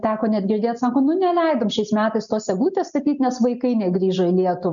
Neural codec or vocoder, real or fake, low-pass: none; real; 7.2 kHz